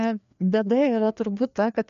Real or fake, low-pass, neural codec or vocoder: fake; 7.2 kHz; codec, 16 kHz, 2 kbps, FreqCodec, larger model